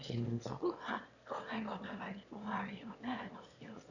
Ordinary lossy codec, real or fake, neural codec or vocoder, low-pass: none; fake; autoencoder, 22.05 kHz, a latent of 192 numbers a frame, VITS, trained on one speaker; 7.2 kHz